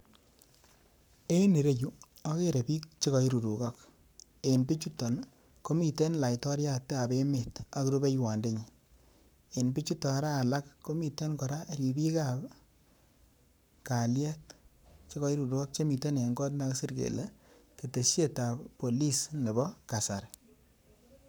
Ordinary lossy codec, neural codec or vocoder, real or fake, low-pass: none; codec, 44.1 kHz, 7.8 kbps, Pupu-Codec; fake; none